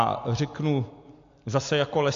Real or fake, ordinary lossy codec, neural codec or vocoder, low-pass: real; AAC, 48 kbps; none; 7.2 kHz